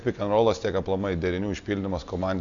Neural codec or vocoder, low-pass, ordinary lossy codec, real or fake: none; 7.2 kHz; Opus, 64 kbps; real